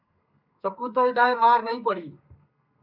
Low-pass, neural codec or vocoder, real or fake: 5.4 kHz; codec, 32 kHz, 1.9 kbps, SNAC; fake